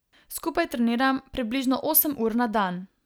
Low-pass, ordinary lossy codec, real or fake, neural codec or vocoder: none; none; real; none